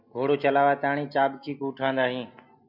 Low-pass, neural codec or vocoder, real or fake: 5.4 kHz; none; real